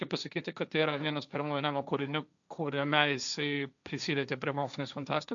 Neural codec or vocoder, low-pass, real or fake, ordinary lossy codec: codec, 16 kHz, 1.1 kbps, Voila-Tokenizer; 7.2 kHz; fake; MP3, 96 kbps